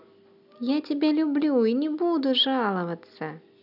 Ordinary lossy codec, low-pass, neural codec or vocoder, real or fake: none; 5.4 kHz; none; real